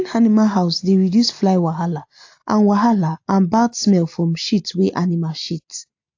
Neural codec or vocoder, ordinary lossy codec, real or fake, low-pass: none; AAC, 48 kbps; real; 7.2 kHz